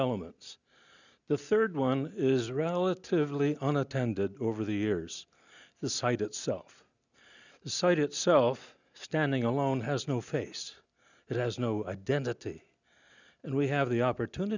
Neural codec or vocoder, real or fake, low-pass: none; real; 7.2 kHz